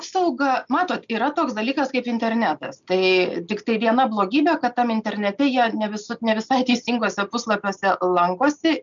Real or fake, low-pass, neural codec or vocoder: real; 7.2 kHz; none